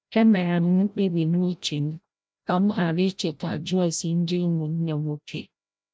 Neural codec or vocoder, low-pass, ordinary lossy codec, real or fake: codec, 16 kHz, 0.5 kbps, FreqCodec, larger model; none; none; fake